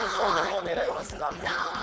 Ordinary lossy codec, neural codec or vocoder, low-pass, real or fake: none; codec, 16 kHz, 4.8 kbps, FACodec; none; fake